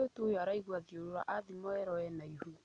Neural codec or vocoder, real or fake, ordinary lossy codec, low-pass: none; real; none; 9.9 kHz